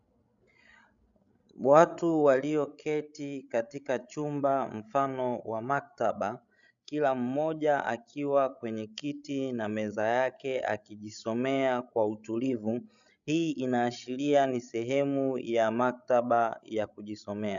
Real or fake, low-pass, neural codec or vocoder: fake; 7.2 kHz; codec, 16 kHz, 16 kbps, FreqCodec, larger model